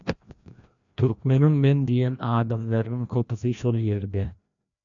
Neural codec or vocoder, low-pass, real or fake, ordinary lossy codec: codec, 16 kHz, 1 kbps, FreqCodec, larger model; 7.2 kHz; fake; MP3, 96 kbps